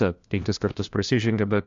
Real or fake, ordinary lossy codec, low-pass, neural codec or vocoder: fake; AAC, 48 kbps; 7.2 kHz; codec, 16 kHz, 1 kbps, FunCodec, trained on LibriTTS, 50 frames a second